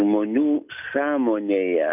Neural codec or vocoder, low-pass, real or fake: none; 3.6 kHz; real